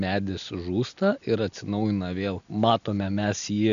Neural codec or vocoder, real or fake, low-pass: none; real; 7.2 kHz